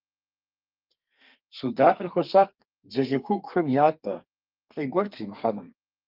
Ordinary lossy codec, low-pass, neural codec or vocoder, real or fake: Opus, 24 kbps; 5.4 kHz; codec, 32 kHz, 1.9 kbps, SNAC; fake